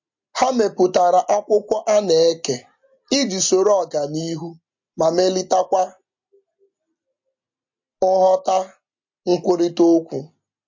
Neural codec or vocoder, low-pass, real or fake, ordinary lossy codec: none; 7.2 kHz; real; MP3, 48 kbps